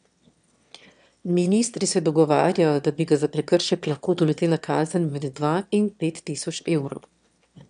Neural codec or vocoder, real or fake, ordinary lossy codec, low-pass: autoencoder, 22.05 kHz, a latent of 192 numbers a frame, VITS, trained on one speaker; fake; none; 9.9 kHz